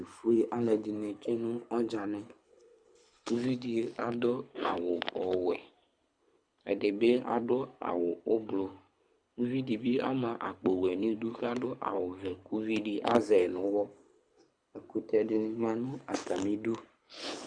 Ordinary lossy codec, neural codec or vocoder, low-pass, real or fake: Opus, 64 kbps; codec, 24 kHz, 6 kbps, HILCodec; 9.9 kHz; fake